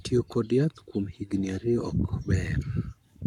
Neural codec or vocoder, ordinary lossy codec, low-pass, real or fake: codec, 44.1 kHz, 7.8 kbps, Pupu-Codec; none; 19.8 kHz; fake